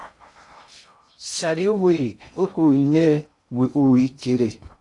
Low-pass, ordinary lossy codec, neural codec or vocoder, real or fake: 10.8 kHz; AAC, 64 kbps; codec, 16 kHz in and 24 kHz out, 0.6 kbps, FocalCodec, streaming, 2048 codes; fake